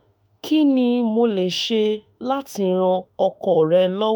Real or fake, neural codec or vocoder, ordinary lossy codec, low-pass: fake; autoencoder, 48 kHz, 32 numbers a frame, DAC-VAE, trained on Japanese speech; none; none